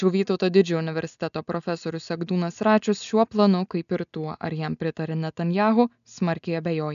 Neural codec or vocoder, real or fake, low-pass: none; real; 7.2 kHz